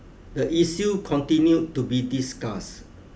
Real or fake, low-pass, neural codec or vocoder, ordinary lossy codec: real; none; none; none